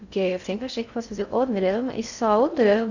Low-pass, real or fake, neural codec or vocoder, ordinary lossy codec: 7.2 kHz; fake; codec, 16 kHz in and 24 kHz out, 0.6 kbps, FocalCodec, streaming, 2048 codes; none